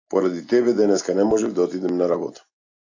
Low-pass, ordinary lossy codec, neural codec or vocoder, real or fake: 7.2 kHz; AAC, 48 kbps; none; real